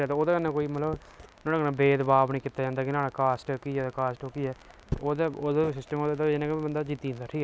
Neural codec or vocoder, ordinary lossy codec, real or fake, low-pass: none; none; real; none